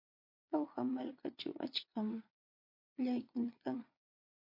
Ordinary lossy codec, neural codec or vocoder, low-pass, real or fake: MP3, 32 kbps; vocoder, 22.05 kHz, 80 mel bands, Vocos; 5.4 kHz; fake